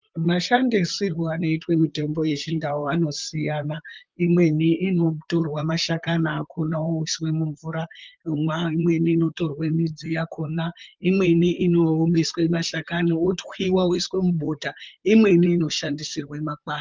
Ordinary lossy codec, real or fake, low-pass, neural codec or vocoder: Opus, 24 kbps; fake; 7.2 kHz; vocoder, 44.1 kHz, 128 mel bands, Pupu-Vocoder